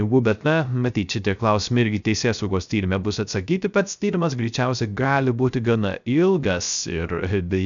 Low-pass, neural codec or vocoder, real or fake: 7.2 kHz; codec, 16 kHz, 0.3 kbps, FocalCodec; fake